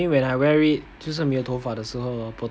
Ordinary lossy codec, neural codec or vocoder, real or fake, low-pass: none; none; real; none